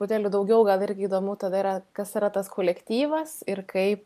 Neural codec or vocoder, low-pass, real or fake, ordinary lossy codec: none; 14.4 kHz; real; MP3, 96 kbps